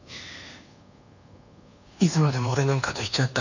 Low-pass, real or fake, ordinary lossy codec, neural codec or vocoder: 7.2 kHz; fake; none; codec, 24 kHz, 1.2 kbps, DualCodec